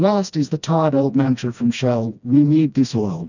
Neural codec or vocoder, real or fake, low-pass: codec, 16 kHz, 1 kbps, FreqCodec, smaller model; fake; 7.2 kHz